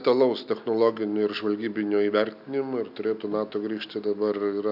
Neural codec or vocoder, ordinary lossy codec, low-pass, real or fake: none; AAC, 48 kbps; 5.4 kHz; real